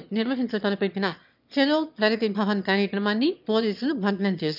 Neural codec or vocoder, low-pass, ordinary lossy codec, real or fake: autoencoder, 22.05 kHz, a latent of 192 numbers a frame, VITS, trained on one speaker; 5.4 kHz; none; fake